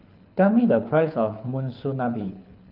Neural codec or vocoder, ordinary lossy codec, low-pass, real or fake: codec, 24 kHz, 6 kbps, HILCodec; none; 5.4 kHz; fake